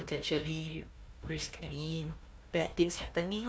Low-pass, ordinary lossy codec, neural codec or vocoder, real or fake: none; none; codec, 16 kHz, 1 kbps, FunCodec, trained on Chinese and English, 50 frames a second; fake